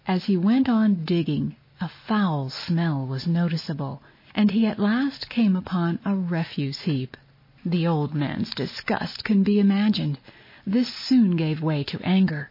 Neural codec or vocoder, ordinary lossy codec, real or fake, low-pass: none; MP3, 24 kbps; real; 5.4 kHz